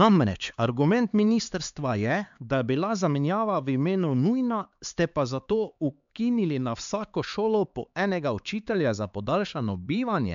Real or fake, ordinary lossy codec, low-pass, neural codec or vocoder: fake; MP3, 64 kbps; 7.2 kHz; codec, 16 kHz, 4 kbps, X-Codec, HuBERT features, trained on LibriSpeech